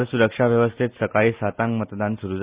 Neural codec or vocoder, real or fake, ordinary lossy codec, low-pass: none; real; Opus, 64 kbps; 3.6 kHz